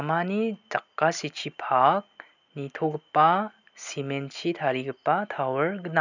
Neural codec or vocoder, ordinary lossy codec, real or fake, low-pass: none; none; real; 7.2 kHz